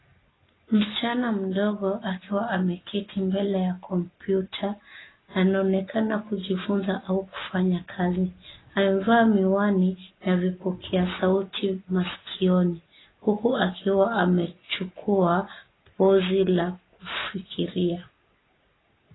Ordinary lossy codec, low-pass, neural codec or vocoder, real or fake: AAC, 16 kbps; 7.2 kHz; none; real